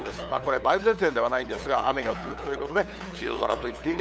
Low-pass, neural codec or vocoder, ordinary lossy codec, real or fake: none; codec, 16 kHz, 8 kbps, FunCodec, trained on LibriTTS, 25 frames a second; none; fake